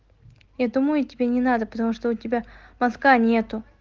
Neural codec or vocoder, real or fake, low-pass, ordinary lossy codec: none; real; 7.2 kHz; Opus, 16 kbps